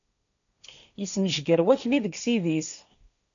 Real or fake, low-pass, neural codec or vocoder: fake; 7.2 kHz; codec, 16 kHz, 1.1 kbps, Voila-Tokenizer